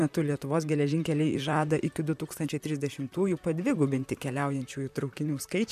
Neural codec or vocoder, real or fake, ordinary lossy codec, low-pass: vocoder, 44.1 kHz, 128 mel bands, Pupu-Vocoder; fake; MP3, 96 kbps; 14.4 kHz